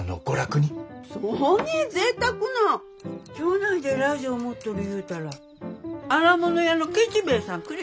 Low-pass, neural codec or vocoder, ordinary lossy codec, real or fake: none; none; none; real